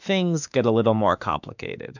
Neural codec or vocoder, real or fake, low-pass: autoencoder, 48 kHz, 32 numbers a frame, DAC-VAE, trained on Japanese speech; fake; 7.2 kHz